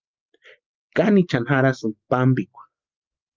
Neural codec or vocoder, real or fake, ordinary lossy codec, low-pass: none; real; Opus, 24 kbps; 7.2 kHz